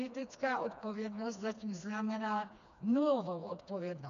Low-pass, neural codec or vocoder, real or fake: 7.2 kHz; codec, 16 kHz, 2 kbps, FreqCodec, smaller model; fake